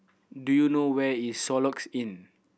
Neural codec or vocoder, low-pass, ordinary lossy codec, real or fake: none; none; none; real